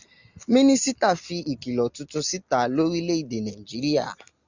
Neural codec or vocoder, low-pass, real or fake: none; 7.2 kHz; real